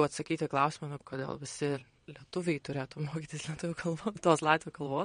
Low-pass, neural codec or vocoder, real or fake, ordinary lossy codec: 9.9 kHz; vocoder, 22.05 kHz, 80 mel bands, WaveNeXt; fake; MP3, 48 kbps